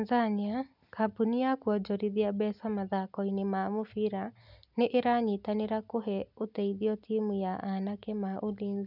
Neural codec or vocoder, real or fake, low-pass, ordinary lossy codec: none; real; 5.4 kHz; none